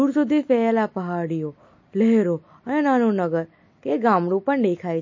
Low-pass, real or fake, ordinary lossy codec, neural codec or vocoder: 7.2 kHz; real; MP3, 32 kbps; none